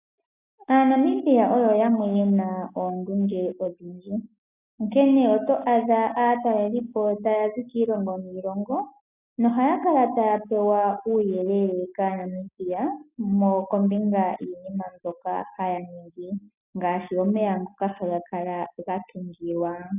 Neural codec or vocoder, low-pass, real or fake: none; 3.6 kHz; real